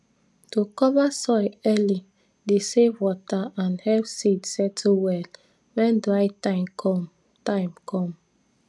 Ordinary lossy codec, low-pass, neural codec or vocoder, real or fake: none; none; none; real